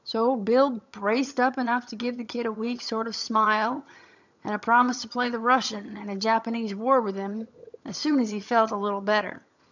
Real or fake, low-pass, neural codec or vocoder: fake; 7.2 kHz; vocoder, 22.05 kHz, 80 mel bands, HiFi-GAN